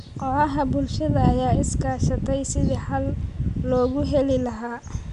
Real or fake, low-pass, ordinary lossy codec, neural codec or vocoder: real; 10.8 kHz; none; none